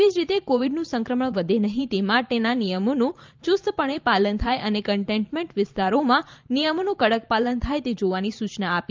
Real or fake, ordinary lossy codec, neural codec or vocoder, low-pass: fake; Opus, 24 kbps; vocoder, 44.1 kHz, 128 mel bands every 512 samples, BigVGAN v2; 7.2 kHz